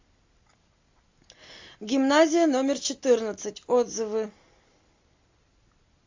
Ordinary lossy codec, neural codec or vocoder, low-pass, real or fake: AAC, 48 kbps; none; 7.2 kHz; real